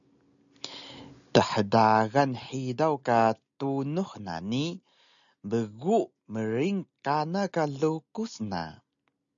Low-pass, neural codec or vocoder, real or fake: 7.2 kHz; none; real